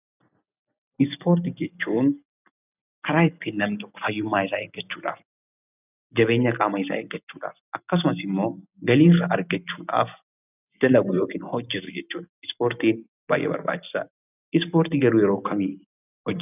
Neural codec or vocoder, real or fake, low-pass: none; real; 3.6 kHz